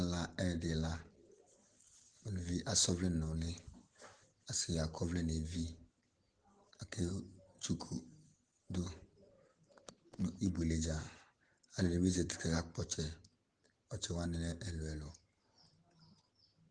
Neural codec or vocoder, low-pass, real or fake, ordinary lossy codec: none; 9.9 kHz; real; Opus, 16 kbps